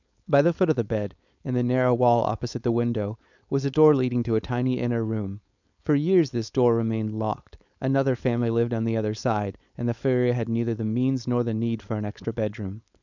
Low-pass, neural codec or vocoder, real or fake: 7.2 kHz; codec, 16 kHz, 4.8 kbps, FACodec; fake